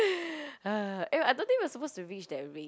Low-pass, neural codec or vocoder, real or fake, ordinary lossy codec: none; none; real; none